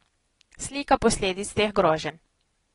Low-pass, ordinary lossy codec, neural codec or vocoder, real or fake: 10.8 kHz; AAC, 32 kbps; none; real